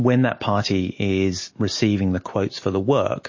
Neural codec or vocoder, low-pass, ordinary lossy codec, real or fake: none; 7.2 kHz; MP3, 32 kbps; real